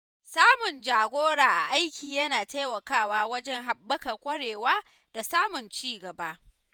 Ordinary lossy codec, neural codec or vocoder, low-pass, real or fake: none; vocoder, 48 kHz, 128 mel bands, Vocos; none; fake